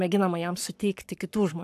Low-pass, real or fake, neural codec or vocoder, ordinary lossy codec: 14.4 kHz; fake; codec, 44.1 kHz, 7.8 kbps, Pupu-Codec; AAC, 96 kbps